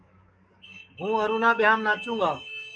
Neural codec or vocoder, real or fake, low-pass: codec, 44.1 kHz, 7.8 kbps, DAC; fake; 9.9 kHz